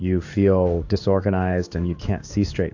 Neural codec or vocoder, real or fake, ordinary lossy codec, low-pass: codec, 16 kHz in and 24 kHz out, 1 kbps, XY-Tokenizer; fake; AAC, 48 kbps; 7.2 kHz